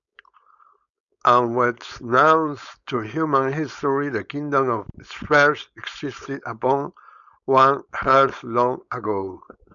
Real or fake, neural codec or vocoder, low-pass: fake; codec, 16 kHz, 4.8 kbps, FACodec; 7.2 kHz